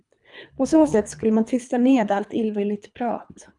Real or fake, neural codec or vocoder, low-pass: fake; codec, 24 kHz, 3 kbps, HILCodec; 10.8 kHz